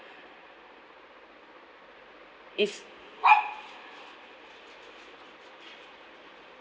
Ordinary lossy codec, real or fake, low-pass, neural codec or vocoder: none; real; none; none